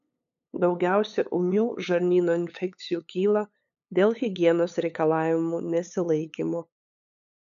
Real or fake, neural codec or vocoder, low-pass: fake; codec, 16 kHz, 8 kbps, FunCodec, trained on LibriTTS, 25 frames a second; 7.2 kHz